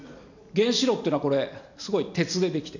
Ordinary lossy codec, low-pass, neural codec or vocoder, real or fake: none; 7.2 kHz; none; real